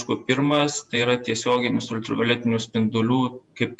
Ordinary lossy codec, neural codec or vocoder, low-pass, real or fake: Opus, 64 kbps; vocoder, 48 kHz, 128 mel bands, Vocos; 10.8 kHz; fake